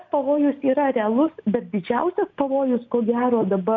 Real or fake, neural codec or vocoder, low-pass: real; none; 7.2 kHz